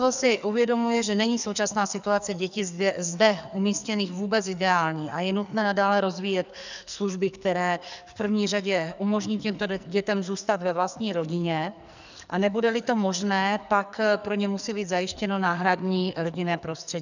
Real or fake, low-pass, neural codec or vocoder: fake; 7.2 kHz; codec, 44.1 kHz, 2.6 kbps, SNAC